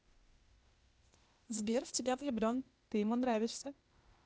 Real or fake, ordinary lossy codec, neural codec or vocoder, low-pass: fake; none; codec, 16 kHz, 0.8 kbps, ZipCodec; none